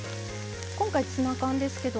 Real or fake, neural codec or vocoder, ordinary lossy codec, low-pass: real; none; none; none